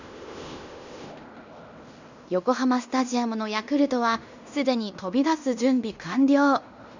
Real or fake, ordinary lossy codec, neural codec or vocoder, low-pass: fake; Opus, 64 kbps; codec, 16 kHz in and 24 kHz out, 0.9 kbps, LongCat-Audio-Codec, fine tuned four codebook decoder; 7.2 kHz